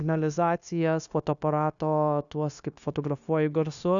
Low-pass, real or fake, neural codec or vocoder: 7.2 kHz; fake; codec, 16 kHz, 0.9 kbps, LongCat-Audio-Codec